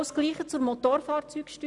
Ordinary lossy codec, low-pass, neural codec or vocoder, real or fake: none; 10.8 kHz; none; real